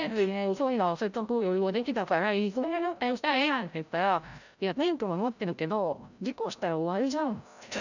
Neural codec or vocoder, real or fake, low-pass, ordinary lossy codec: codec, 16 kHz, 0.5 kbps, FreqCodec, larger model; fake; 7.2 kHz; none